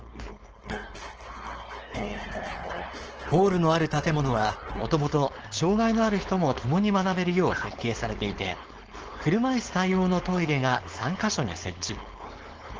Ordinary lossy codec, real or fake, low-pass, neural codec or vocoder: Opus, 16 kbps; fake; 7.2 kHz; codec, 16 kHz, 4.8 kbps, FACodec